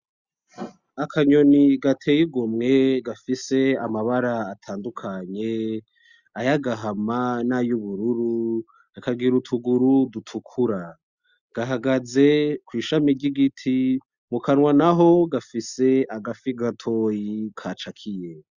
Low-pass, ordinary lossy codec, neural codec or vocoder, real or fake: 7.2 kHz; Opus, 64 kbps; none; real